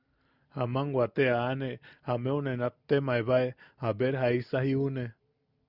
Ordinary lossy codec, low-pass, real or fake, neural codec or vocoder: Opus, 64 kbps; 5.4 kHz; real; none